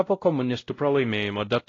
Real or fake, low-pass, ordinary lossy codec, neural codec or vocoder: fake; 7.2 kHz; AAC, 32 kbps; codec, 16 kHz, 0.5 kbps, X-Codec, WavLM features, trained on Multilingual LibriSpeech